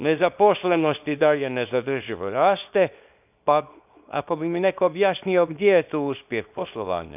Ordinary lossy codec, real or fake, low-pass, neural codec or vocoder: none; fake; 3.6 kHz; codec, 24 kHz, 0.9 kbps, WavTokenizer, small release